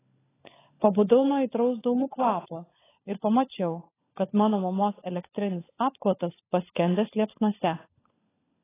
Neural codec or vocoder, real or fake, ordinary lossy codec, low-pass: codec, 16 kHz, 16 kbps, FunCodec, trained on LibriTTS, 50 frames a second; fake; AAC, 16 kbps; 3.6 kHz